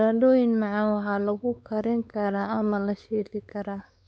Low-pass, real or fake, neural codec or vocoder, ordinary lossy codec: none; fake; codec, 16 kHz, 4 kbps, X-Codec, WavLM features, trained on Multilingual LibriSpeech; none